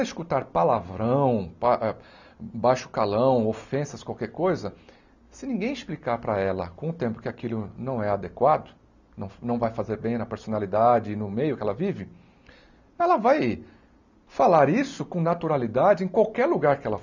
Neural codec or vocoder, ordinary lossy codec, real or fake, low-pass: none; none; real; 7.2 kHz